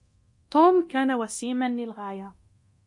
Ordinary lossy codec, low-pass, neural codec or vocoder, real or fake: MP3, 48 kbps; 10.8 kHz; codec, 24 kHz, 0.9 kbps, DualCodec; fake